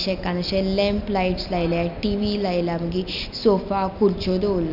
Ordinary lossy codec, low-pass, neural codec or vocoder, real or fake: none; 5.4 kHz; none; real